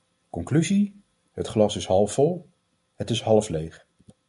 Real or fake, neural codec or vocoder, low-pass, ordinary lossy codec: real; none; 10.8 kHz; MP3, 64 kbps